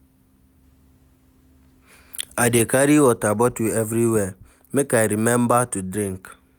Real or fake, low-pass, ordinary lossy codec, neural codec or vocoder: real; none; none; none